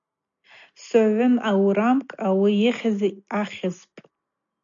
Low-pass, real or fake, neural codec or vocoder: 7.2 kHz; real; none